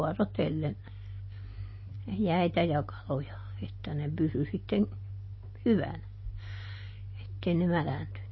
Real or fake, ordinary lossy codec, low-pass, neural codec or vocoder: real; MP3, 24 kbps; 7.2 kHz; none